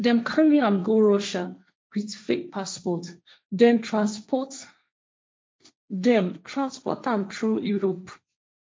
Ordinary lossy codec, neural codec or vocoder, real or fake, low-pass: none; codec, 16 kHz, 1.1 kbps, Voila-Tokenizer; fake; none